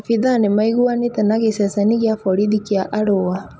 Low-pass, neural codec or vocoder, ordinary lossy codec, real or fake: none; none; none; real